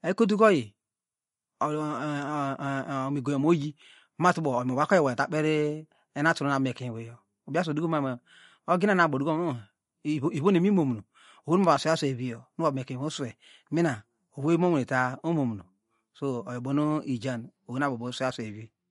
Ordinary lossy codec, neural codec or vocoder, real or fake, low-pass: MP3, 48 kbps; none; real; 19.8 kHz